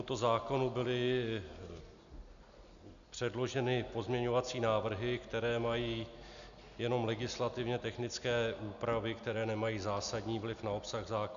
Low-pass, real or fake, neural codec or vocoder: 7.2 kHz; real; none